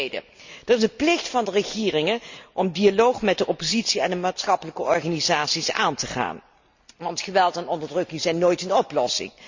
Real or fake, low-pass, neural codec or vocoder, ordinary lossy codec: real; 7.2 kHz; none; Opus, 64 kbps